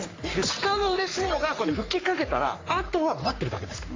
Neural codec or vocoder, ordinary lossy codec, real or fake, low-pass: codec, 44.1 kHz, 3.4 kbps, Pupu-Codec; AAC, 32 kbps; fake; 7.2 kHz